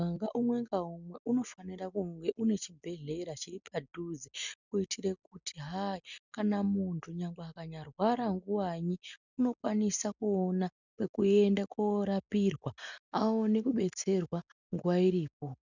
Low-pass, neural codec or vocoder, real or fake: 7.2 kHz; none; real